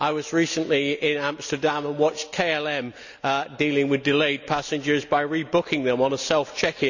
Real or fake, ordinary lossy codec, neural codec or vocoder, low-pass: real; none; none; 7.2 kHz